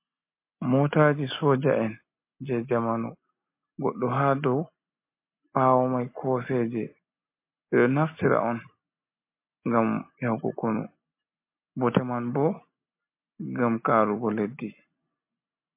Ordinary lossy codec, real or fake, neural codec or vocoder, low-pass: MP3, 24 kbps; real; none; 3.6 kHz